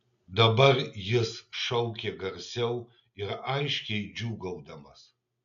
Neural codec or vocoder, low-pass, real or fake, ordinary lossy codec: none; 7.2 kHz; real; AAC, 96 kbps